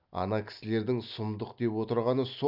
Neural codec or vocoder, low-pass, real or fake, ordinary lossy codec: none; 5.4 kHz; real; none